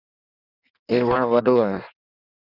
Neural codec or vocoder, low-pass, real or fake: codec, 16 kHz in and 24 kHz out, 1.1 kbps, FireRedTTS-2 codec; 5.4 kHz; fake